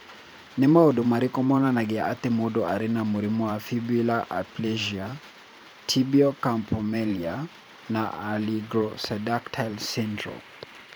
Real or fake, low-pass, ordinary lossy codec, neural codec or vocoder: fake; none; none; vocoder, 44.1 kHz, 128 mel bands every 512 samples, BigVGAN v2